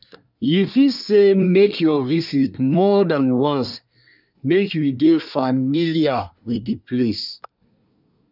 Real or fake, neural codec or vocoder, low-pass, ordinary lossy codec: fake; codec, 24 kHz, 1 kbps, SNAC; 5.4 kHz; none